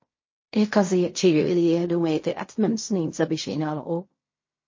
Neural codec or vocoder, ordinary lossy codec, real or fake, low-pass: codec, 16 kHz in and 24 kHz out, 0.4 kbps, LongCat-Audio-Codec, fine tuned four codebook decoder; MP3, 32 kbps; fake; 7.2 kHz